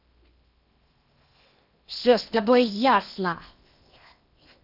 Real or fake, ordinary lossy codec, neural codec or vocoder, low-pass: fake; none; codec, 16 kHz in and 24 kHz out, 0.8 kbps, FocalCodec, streaming, 65536 codes; 5.4 kHz